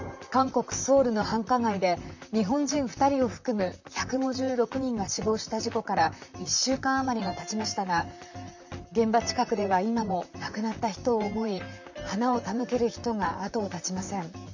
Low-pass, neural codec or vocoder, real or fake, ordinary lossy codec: 7.2 kHz; vocoder, 44.1 kHz, 128 mel bands, Pupu-Vocoder; fake; none